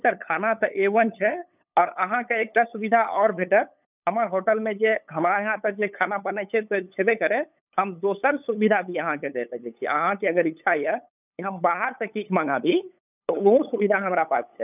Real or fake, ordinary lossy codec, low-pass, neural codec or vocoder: fake; none; 3.6 kHz; codec, 16 kHz, 8 kbps, FunCodec, trained on LibriTTS, 25 frames a second